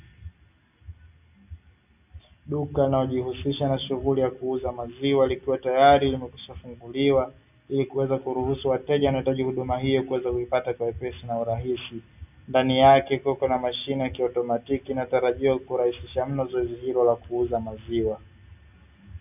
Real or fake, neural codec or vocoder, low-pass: real; none; 3.6 kHz